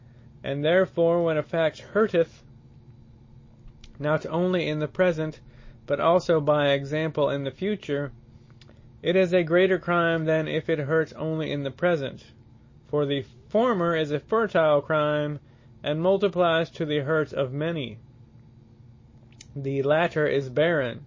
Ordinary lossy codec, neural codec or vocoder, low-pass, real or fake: MP3, 32 kbps; none; 7.2 kHz; real